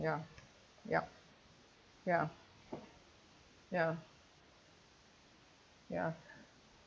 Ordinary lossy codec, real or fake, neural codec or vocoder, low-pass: none; real; none; none